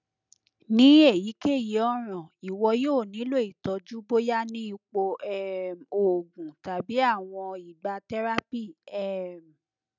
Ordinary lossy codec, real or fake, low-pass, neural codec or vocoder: none; real; 7.2 kHz; none